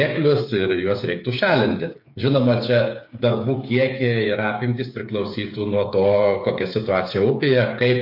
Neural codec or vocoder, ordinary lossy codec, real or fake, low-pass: codec, 16 kHz, 8 kbps, FreqCodec, smaller model; MP3, 32 kbps; fake; 5.4 kHz